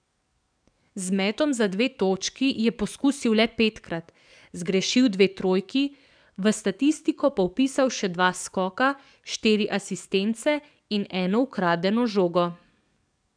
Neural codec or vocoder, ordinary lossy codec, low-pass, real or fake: codec, 44.1 kHz, 7.8 kbps, DAC; none; 9.9 kHz; fake